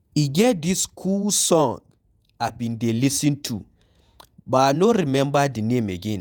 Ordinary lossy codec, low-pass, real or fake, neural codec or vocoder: none; none; fake; vocoder, 48 kHz, 128 mel bands, Vocos